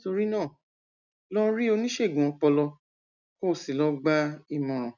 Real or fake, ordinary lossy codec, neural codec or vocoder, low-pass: real; none; none; 7.2 kHz